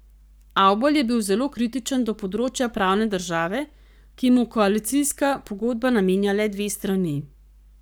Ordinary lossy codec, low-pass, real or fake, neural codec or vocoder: none; none; fake; codec, 44.1 kHz, 7.8 kbps, Pupu-Codec